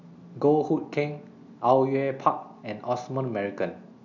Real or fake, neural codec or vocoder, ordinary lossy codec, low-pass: real; none; none; 7.2 kHz